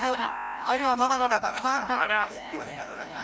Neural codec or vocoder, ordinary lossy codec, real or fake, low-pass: codec, 16 kHz, 0.5 kbps, FreqCodec, larger model; none; fake; none